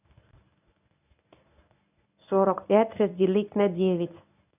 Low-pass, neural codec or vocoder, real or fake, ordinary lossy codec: 3.6 kHz; codec, 24 kHz, 0.9 kbps, WavTokenizer, medium speech release version 1; fake; none